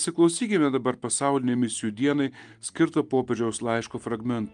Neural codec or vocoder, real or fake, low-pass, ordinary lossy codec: none; real; 9.9 kHz; Opus, 32 kbps